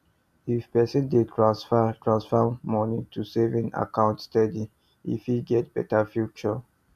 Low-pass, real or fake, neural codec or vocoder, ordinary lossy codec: 14.4 kHz; real; none; none